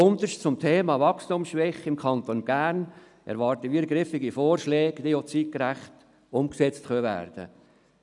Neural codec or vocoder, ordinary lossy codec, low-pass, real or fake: none; none; 10.8 kHz; real